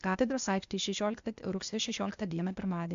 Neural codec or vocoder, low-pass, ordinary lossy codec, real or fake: codec, 16 kHz, 0.8 kbps, ZipCodec; 7.2 kHz; MP3, 64 kbps; fake